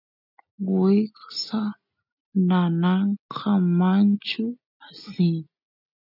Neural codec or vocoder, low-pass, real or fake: none; 5.4 kHz; real